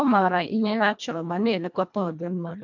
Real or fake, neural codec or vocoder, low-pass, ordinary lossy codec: fake; codec, 24 kHz, 1.5 kbps, HILCodec; 7.2 kHz; MP3, 64 kbps